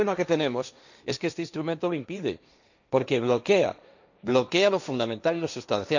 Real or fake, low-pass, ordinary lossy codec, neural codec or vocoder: fake; 7.2 kHz; none; codec, 16 kHz, 1.1 kbps, Voila-Tokenizer